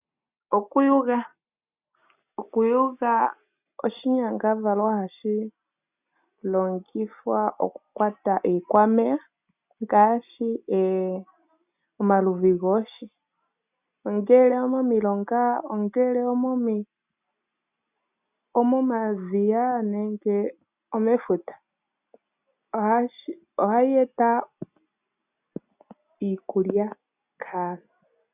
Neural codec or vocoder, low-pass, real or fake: none; 3.6 kHz; real